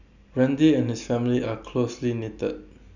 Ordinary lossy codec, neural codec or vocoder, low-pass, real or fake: none; none; 7.2 kHz; real